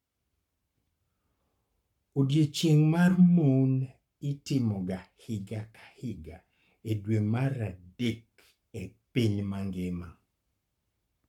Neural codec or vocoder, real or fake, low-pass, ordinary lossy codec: codec, 44.1 kHz, 7.8 kbps, Pupu-Codec; fake; 19.8 kHz; MP3, 96 kbps